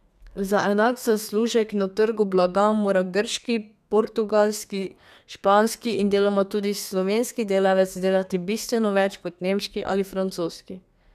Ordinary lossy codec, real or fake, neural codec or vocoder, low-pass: none; fake; codec, 32 kHz, 1.9 kbps, SNAC; 14.4 kHz